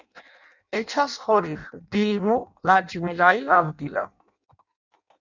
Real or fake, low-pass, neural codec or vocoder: fake; 7.2 kHz; codec, 16 kHz in and 24 kHz out, 0.6 kbps, FireRedTTS-2 codec